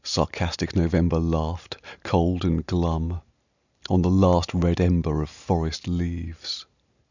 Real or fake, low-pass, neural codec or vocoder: real; 7.2 kHz; none